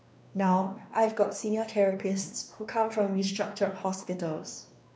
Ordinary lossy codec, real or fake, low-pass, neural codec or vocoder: none; fake; none; codec, 16 kHz, 2 kbps, X-Codec, WavLM features, trained on Multilingual LibriSpeech